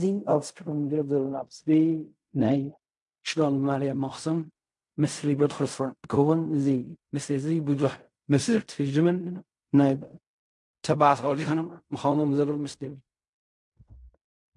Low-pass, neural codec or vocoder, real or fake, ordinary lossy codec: 10.8 kHz; codec, 16 kHz in and 24 kHz out, 0.4 kbps, LongCat-Audio-Codec, fine tuned four codebook decoder; fake; MP3, 64 kbps